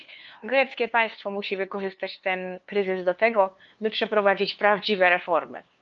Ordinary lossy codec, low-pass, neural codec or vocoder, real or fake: Opus, 24 kbps; 7.2 kHz; codec, 16 kHz, 2 kbps, FunCodec, trained on LibriTTS, 25 frames a second; fake